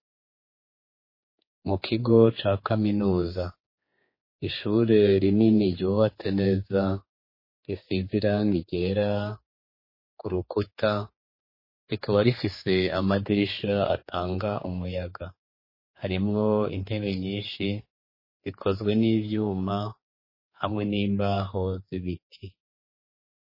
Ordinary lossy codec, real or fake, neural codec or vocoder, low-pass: MP3, 24 kbps; fake; codec, 16 kHz, 4 kbps, X-Codec, HuBERT features, trained on general audio; 5.4 kHz